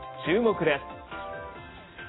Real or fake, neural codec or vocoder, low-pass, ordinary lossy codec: real; none; 7.2 kHz; AAC, 16 kbps